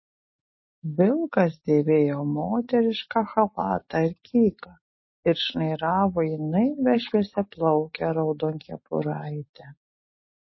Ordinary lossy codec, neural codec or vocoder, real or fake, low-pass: MP3, 24 kbps; none; real; 7.2 kHz